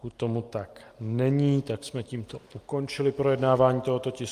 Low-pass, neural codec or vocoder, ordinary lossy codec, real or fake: 14.4 kHz; none; Opus, 32 kbps; real